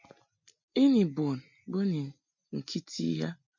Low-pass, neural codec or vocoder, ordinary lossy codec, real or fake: 7.2 kHz; none; MP3, 64 kbps; real